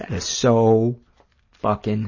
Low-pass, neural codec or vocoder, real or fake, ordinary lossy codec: 7.2 kHz; none; real; MP3, 32 kbps